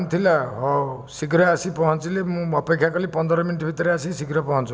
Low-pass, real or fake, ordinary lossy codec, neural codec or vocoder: none; real; none; none